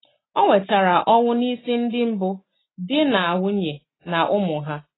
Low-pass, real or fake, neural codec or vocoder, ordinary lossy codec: 7.2 kHz; real; none; AAC, 16 kbps